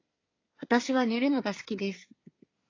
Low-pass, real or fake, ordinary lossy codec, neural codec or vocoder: 7.2 kHz; fake; AAC, 32 kbps; codec, 24 kHz, 1 kbps, SNAC